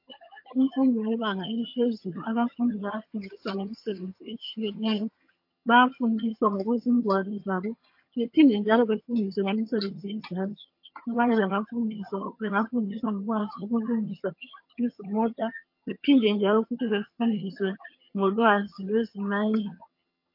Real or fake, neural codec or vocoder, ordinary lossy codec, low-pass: fake; vocoder, 22.05 kHz, 80 mel bands, HiFi-GAN; MP3, 32 kbps; 5.4 kHz